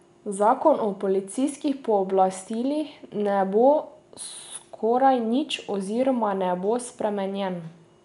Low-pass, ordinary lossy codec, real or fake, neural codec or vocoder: 10.8 kHz; none; real; none